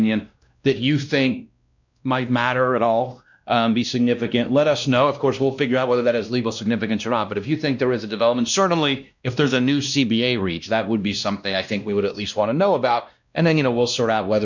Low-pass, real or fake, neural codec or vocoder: 7.2 kHz; fake; codec, 16 kHz, 1 kbps, X-Codec, WavLM features, trained on Multilingual LibriSpeech